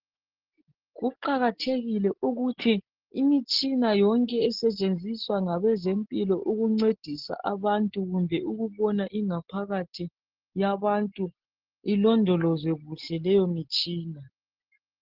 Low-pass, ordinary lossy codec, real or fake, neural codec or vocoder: 5.4 kHz; Opus, 16 kbps; real; none